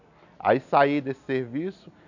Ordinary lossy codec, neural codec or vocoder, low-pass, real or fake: none; none; 7.2 kHz; real